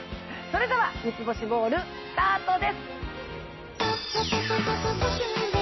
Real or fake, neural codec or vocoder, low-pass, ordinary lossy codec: real; none; 7.2 kHz; MP3, 24 kbps